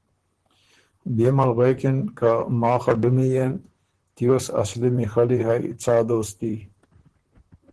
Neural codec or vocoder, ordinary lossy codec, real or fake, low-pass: vocoder, 44.1 kHz, 128 mel bands, Pupu-Vocoder; Opus, 16 kbps; fake; 10.8 kHz